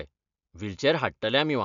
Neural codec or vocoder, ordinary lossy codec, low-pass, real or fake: none; none; 7.2 kHz; real